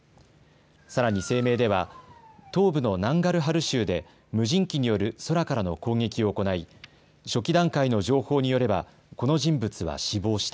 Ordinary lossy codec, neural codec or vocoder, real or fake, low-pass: none; none; real; none